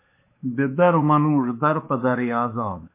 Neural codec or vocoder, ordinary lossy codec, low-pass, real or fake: codec, 16 kHz, 2 kbps, X-Codec, WavLM features, trained on Multilingual LibriSpeech; MP3, 24 kbps; 3.6 kHz; fake